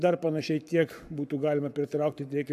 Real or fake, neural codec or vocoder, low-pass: fake; codec, 44.1 kHz, 7.8 kbps, Pupu-Codec; 14.4 kHz